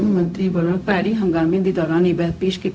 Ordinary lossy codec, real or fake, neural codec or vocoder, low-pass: none; fake; codec, 16 kHz, 0.4 kbps, LongCat-Audio-Codec; none